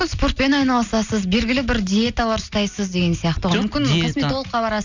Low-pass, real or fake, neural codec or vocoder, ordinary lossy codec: 7.2 kHz; real; none; none